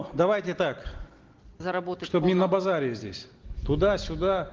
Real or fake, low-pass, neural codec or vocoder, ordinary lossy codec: real; 7.2 kHz; none; Opus, 16 kbps